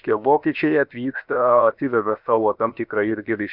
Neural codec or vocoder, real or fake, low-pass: codec, 16 kHz, 0.7 kbps, FocalCodec; fake; 5.4 kHz